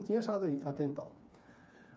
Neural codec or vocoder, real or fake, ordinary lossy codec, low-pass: codec, 16 kHz, 4 kbps, FreqCodec, smaller model; fake; none; none